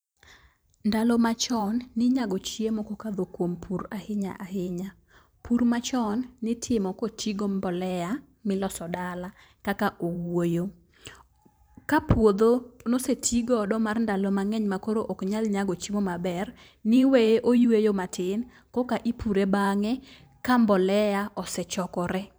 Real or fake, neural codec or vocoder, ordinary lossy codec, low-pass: fake; vocoder, 44.1 kHz, 128 mel bands every 512 samples, BigVGAN v2; none; none